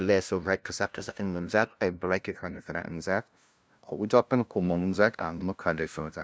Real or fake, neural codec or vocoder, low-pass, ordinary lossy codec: fake; codec, 16 kHz, 0.5 kbps, FunCodec, trained on LibriTTS, 25 frames a second; none; none